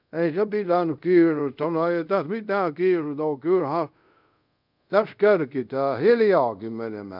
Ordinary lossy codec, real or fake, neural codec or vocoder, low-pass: none; fake; codec, 24 kHz, 0.5 kbps, DualCodec; 5.4 kHz